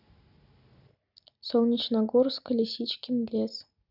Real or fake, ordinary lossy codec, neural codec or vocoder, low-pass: real; none; none; 5.4 kHz